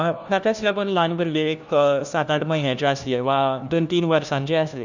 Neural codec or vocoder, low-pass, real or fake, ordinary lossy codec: codec, 16 kHz, 1 kbps, FunCodec, trained on LibriTTS, 50 frames a second; 7.2 kHz; fake; none